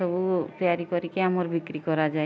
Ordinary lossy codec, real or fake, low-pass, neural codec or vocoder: none; real; none; none